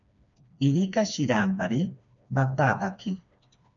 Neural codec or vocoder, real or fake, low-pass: codec, 16 kHz, 2 kbps, FreqCodec, smaller model; fake; 7.2 kHz